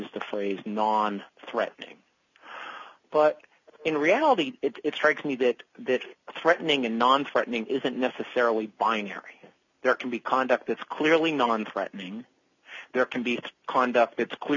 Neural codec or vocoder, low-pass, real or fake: none; 7.2 kHz; real